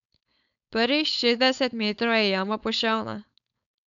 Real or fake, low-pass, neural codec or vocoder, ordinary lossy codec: fake; 7.2 kHz; codec, 16 kHz, 4.8 kbps, FACodec; none